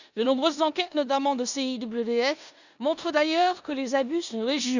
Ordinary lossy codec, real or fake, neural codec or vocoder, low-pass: none; fake; codec, 16 kHz in and 24 kHz out, 0.9 kbps, LongCat-Audio-Codec, four codebook decoder; 7.2 kHz